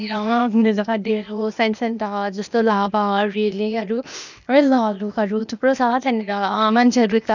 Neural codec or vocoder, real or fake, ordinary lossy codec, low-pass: codec, 16 kHz, 0.8 kbps, ZipCodec; fake; none; 7.2 kHz